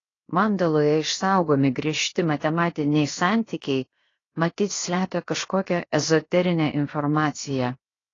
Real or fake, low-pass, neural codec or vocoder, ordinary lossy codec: fake; 7.2 kHz; codec, 16 kHz, 0.7 kbps, FocalCodec; AAC, 32 kbps